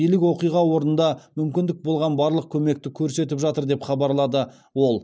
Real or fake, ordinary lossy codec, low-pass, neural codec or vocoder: real; none; none; none